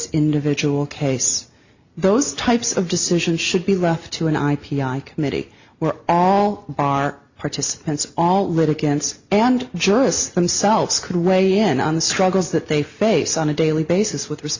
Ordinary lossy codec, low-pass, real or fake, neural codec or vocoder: Opus, 64 kbps; 7.2 kHz; real; none